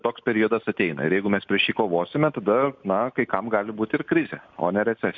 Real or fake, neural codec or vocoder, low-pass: real; none; 7.2 kHz